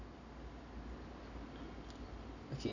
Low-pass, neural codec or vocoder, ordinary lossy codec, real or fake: 7.2 kHz; none; none; real